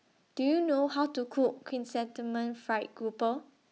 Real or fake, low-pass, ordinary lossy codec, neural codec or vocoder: real; none; none; none